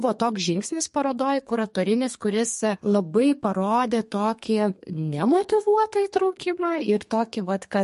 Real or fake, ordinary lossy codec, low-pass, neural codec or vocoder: fake; MP3, 48 kbps; 14.4 kHz; codec, 32 kHz, 1.9 kbps, SNAC